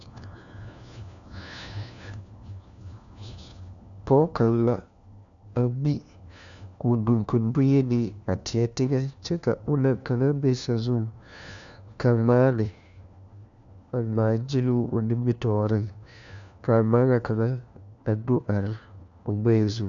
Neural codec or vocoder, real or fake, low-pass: codec, 16 kHz, 1 kbps, FunCodec, trained on LibriTTS, 50 frames a second; fake; 7.2 kHz